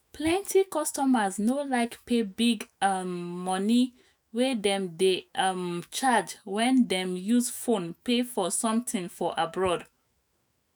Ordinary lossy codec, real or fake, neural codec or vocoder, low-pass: none; fake; autoencoder, 48 kHz, 128 numbers a frame, DAC-VAE, trained on Japanese speech; none